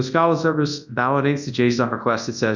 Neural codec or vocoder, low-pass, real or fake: codec, 24 kHz, 0.9 kbps, WavTokenizer, large speech release; 7.2 kHz; fake